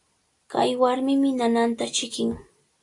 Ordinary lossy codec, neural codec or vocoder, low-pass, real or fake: AAC, 48 kbps; none; 10.8 kHz; real